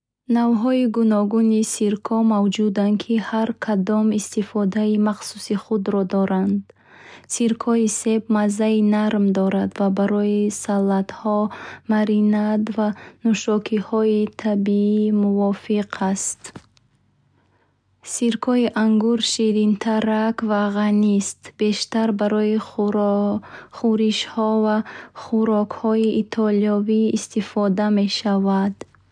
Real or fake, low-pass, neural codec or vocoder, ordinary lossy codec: real; 9.9 kHz; none; none